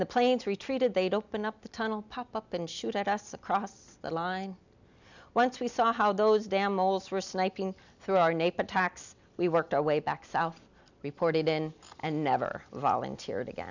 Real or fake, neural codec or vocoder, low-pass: real; none; 7.2 kHz